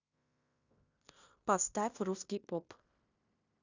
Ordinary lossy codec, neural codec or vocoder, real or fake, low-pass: Opus, 64 kbps; codec, 16 kHz in and 24 kHz out, 0.9 kbps, LongCat-Audio-Codec, fine tuned four codebook decoder; fake; 7.2 kHz